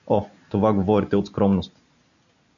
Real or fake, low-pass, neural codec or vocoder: real; 7.2 kHz; none